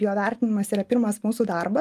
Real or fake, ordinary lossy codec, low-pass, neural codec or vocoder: real; Opus, 32 kbps; 14.4 kHz; none